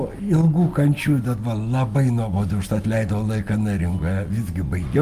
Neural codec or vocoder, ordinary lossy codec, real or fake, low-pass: none; Opus, 32 kbps; real; 14.4 kHz